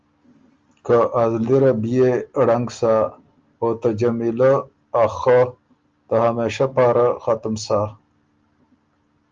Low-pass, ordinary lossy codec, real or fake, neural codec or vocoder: 7.2 kHz; Opus, 32 kbps; real; none